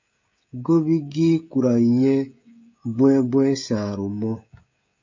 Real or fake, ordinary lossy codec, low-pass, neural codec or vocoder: fake; MP3, 48 kbps; 7.2 kHz; codec, 16 kHz, 16 kbps, FreqCodec, smaller model